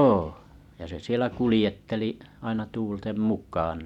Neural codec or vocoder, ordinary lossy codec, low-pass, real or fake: none; none; 19.8 kHz; real